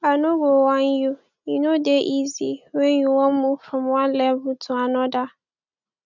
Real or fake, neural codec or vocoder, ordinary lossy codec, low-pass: real; none; none; 7.2 kHz